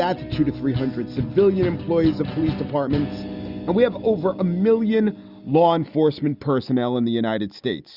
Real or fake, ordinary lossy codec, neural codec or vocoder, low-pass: real; Opus, 64 kbps; none; 5.4 kHz